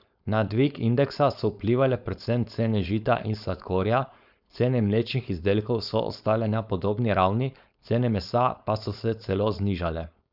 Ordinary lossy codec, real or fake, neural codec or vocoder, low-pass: none; fake; codec, 16 kHz, 4.8 kbps, FACodec; 5.4 kHz